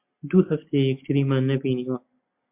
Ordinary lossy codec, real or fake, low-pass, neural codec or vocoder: MP3, 32 kbps; real; 3.6 kHz; none